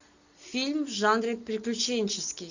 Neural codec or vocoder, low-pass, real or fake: none; 7.2 kHz; real